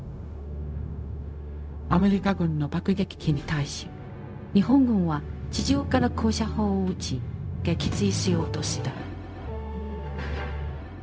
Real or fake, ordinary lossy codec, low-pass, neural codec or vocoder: fake; none; none; codec, 16 kHz, 0.4 kbps, LongCat-Audio-Codec